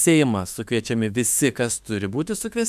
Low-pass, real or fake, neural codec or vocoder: 14.4 kHz; fake; autoencoder, 48 kHz, 32 numbers a frame, DAC-VAE, trained on Japanese speech